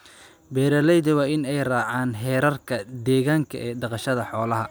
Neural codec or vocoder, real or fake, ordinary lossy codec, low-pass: none; real; none; none